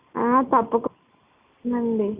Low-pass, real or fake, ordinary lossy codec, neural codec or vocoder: 3.6 kHz; real; Opus, 64 kbps; none